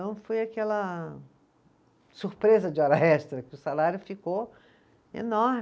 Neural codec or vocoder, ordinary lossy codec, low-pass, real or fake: none; none; none; real